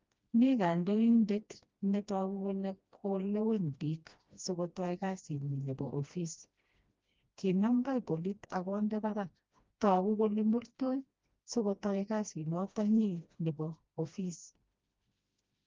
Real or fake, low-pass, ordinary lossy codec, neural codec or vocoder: fake; 7.2 kHz; Opus, 24 kbps; codec, 16 kHz, 1 kbps, FreqCodec, smaller model